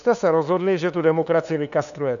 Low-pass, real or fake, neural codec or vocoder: 7.2 kHz; fake; codec, 16 kHz, 2 kbps, FunCodec, trained on LibriTTS, 25 frames a second